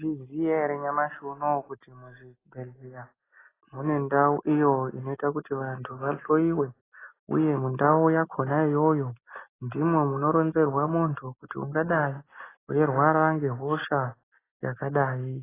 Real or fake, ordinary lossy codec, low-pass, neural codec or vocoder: real; AAC, 16 kbps; 3.6 kHz; none